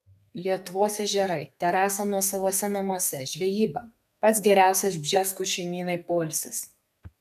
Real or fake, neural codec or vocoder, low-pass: fake; codec, 32 kHz, 1.9 kbps, SNAC; 14.4 kHz